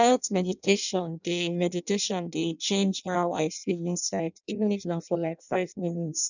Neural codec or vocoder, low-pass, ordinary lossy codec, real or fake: codec, 16 kHz in and 24 kHz out, 0.6 kbps, FireRedTTS-2 codec; 7.2 kHz; none; fake